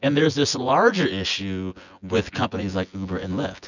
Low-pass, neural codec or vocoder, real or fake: 7.2 kHz; vocoder, 24 kHz, 100 mel bands, Vocos; fake